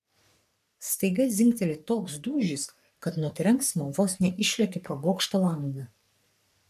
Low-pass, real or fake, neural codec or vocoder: 14.4 kHz; fake; codec, 44.1 kHz, 3.4 kbps, Pupu-Codec